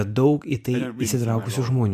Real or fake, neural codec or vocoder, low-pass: fake; vocoder, 44.1 kHz, 128 mel bands every 512 samples, BigVGAN v2; 14.4 kHz